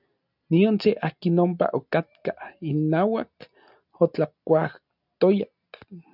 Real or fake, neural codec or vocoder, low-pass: real; none; 5.4 kHz